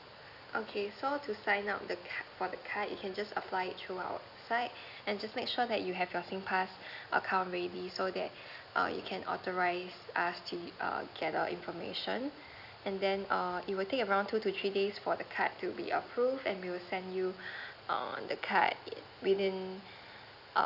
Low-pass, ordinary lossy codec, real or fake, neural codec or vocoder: 5.4 kHz; none; real; none